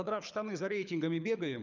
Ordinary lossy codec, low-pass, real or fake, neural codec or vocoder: none; 7.2 kHz; fake; codec, 24 kHz, 6 kbps, HILCodec